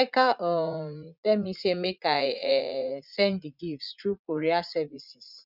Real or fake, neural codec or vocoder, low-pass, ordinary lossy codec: fake; vocoder, 44.1 kHz, 80 mel bands, Vocos; 5.4 kHz; none